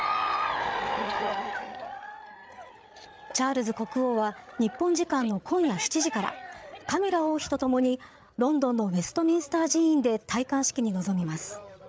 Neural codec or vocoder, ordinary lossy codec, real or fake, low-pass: codec, 16 kHz, 8 kbps, FreqCodec, larger model; none; fake; none